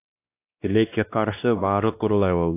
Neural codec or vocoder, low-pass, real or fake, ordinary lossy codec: codec, 16 kHz, 1 kbps, X-Codec, WavLM features, trained on Multilingual LibriSpeech; 3.6 kHz; fake; AAC, 24 kbps